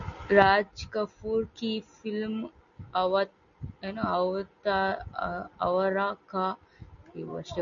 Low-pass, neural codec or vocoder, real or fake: 7.2 kHz; none; real